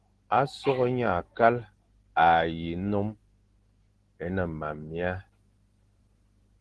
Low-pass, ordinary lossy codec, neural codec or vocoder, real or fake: 10.8 kHz; Opus, 16 kbps; none; real